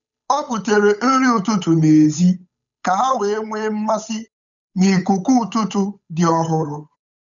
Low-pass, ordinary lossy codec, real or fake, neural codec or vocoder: 7.2 kHz; none; fake; codec, 16 kHz, 8 kbps, FunCodec, trained on Chinese and English, 25 frames a second